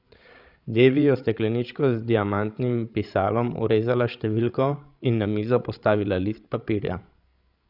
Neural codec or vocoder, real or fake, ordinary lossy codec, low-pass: codec, 16 kHz, 16 kbps, FreqCodec, larger model; fake; none; 5.4 kHz